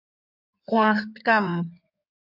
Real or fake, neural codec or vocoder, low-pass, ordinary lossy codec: fake; codec, 16 kHz in and 24 kHz out, 2.2 kbps, FireRedTTS-2 codec; 5.4 kHz; MP3, 48 kbps